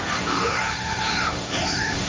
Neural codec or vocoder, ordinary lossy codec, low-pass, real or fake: codec, 16 kHz, 1.1 kbps, Voila-Tokenizer; none; none; fake